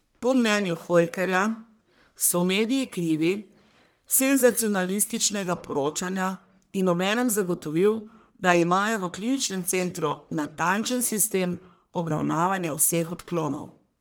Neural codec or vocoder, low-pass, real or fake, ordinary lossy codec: codec, 44.1 kHz, 1.7 kbps, Pupu-Codec; none; fake; none